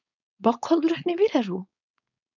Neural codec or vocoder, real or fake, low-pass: codec, 16 kHz, 4.8 kbps, FACodec; fake; 7.2 kHz